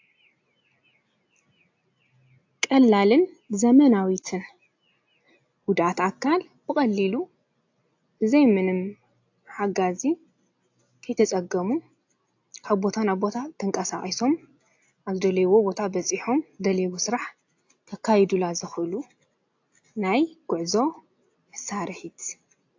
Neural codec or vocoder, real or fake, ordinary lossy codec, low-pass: none; real; AAC, 48 kbps; 7.2 kHz